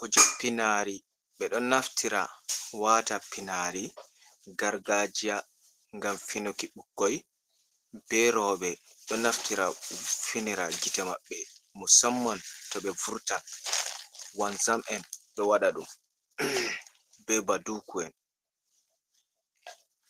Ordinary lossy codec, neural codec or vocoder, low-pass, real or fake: Opus, 16 kbps; none; 14.4 kHz; real